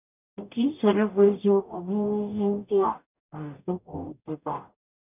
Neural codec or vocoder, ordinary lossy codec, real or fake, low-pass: codec, 44.1 kHz, 0.9 kbps, DAC; none; fake; 3.6 kHz